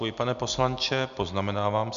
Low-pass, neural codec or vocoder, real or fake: 7.2 kHz; none; real